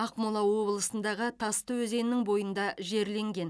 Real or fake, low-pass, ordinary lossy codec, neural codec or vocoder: real; none; none; none